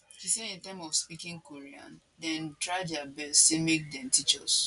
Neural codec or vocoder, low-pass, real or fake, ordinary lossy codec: none; 10.8 kHz; real; none